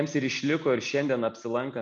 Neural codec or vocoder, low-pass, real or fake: none; 10.8 kHz; real